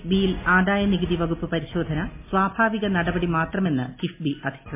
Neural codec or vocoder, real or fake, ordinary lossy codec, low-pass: none; real; MP3, 24 kbps; 3.6 kHz